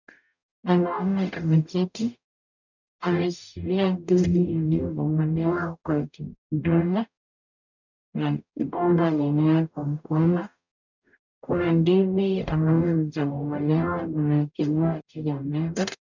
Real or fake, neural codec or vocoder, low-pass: fake; codec, 44.1 kHz, 0.9 kbps, DAC; 7.2 kHz